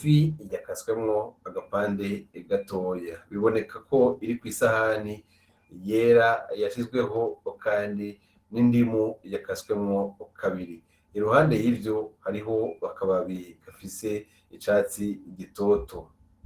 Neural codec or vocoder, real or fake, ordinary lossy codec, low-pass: none; real; Opus, 16 kbps; 14.4 kHz